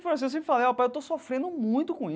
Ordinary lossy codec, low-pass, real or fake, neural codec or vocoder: none; none; real; none